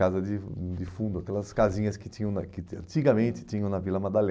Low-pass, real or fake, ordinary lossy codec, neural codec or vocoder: none; real; none; none